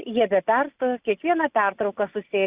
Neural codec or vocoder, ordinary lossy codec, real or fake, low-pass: none; Opus, 64 kbps; real; 3.6 kHz